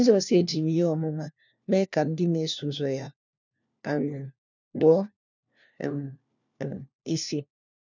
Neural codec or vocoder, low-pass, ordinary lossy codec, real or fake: codec, 16 kHz, 1 kbps, FunCodec, trained on LibriTTS, 50 frames a second; 7.2 kHz; none; fake